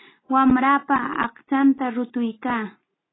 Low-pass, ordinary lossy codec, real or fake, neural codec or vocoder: 7.2 kHz; AAC, 16 kbps; real; none